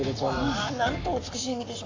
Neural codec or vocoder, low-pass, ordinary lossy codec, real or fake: codec, 44.1 kHz, 7.8 kbps, Pupu-Codec; 7.2 kHz; AAC, 48 kbps; fake